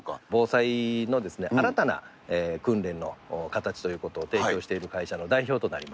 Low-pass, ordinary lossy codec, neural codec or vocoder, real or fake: none; none; none; real